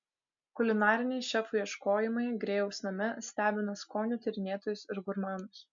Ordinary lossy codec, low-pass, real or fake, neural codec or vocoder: MP3, 64 kbps; 7.2 kHz; real; none